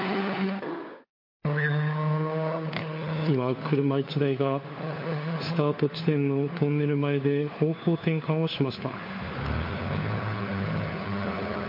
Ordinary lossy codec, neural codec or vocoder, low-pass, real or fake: MP3, 32 kbps; codec, 16 kHz, 4 kbps, FunCodec, trained on LibriTTS, 50 frames a second; 5.4 kHz; fake